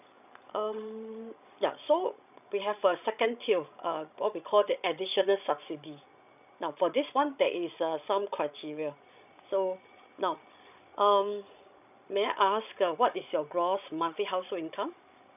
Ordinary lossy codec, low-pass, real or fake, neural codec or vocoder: none; 3.6 kHz; fake; codec, 16 kHz, 16 kbps, FreqCodec, larger model